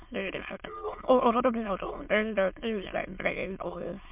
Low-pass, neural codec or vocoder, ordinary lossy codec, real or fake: 3.6 kHz; autoencoder, 22.05 kHz, a latent of 192 numbers a frame, VITS, trained on many speakers; MP3, 32 kbps; fake